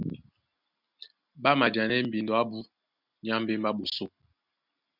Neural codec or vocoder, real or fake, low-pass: none; real; 5.4 kHz